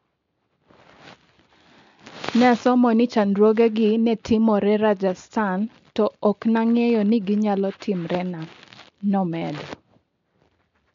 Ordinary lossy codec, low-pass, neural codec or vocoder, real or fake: MP3, 64 kbps; 7.2 kHz; none; real